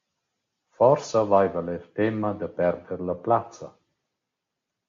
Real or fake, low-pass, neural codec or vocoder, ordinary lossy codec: real; 7.2 kHz; none; MP3, 48 kbps